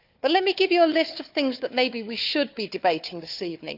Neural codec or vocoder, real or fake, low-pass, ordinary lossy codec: codec, 16 kHz, 4 kbps, FunCodec, trained on Chinese and English, 50 frames a second; fake; 5.4 kHz; none